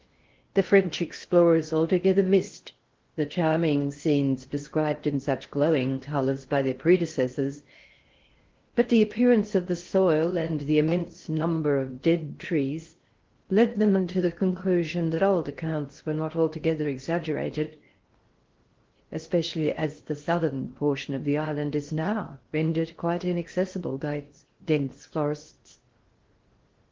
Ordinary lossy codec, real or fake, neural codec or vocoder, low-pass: Opus, 16 kbps; fake; codec, 16 kHz in and 24 kHz out, 0.6 kbps, FocalCodec, streaming, 4096 codes; 7.2 kHz